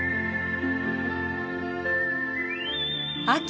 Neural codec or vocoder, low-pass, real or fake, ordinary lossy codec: none; none; real; none